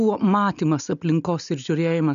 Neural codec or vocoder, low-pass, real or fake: none; 7.2 kHz; real